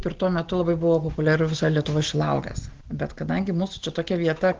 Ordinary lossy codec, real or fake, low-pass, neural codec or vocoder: Opus, 16 kbps; real; 7.2 kHz; none